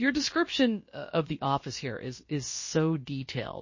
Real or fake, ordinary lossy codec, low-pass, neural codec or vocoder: fake; MP3, 32 kbps; 7.2 kHz; codec, 16 kHz, about 1 kbps, DyCAST, with the encoder's durations